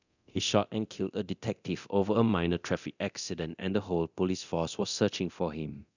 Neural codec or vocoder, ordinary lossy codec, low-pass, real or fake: codec, 24 kHz, 0.9 kbps, DualCodec; none; 7.2 kHz; fake